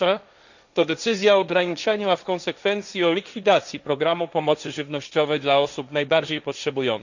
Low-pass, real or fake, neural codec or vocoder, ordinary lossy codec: 7.2 kHz; fake; codec, 16 kHz, 1.1 kbps, Voila-Tokenizer; none